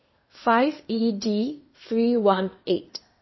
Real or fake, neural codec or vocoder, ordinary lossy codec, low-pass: fake; codec, 16 kHz, 0.8 kbps, ZipCodec; MP3, 24 kbps; 7.2 kHz